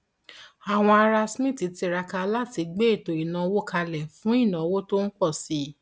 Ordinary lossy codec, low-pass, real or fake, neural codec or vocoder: none; none; real; none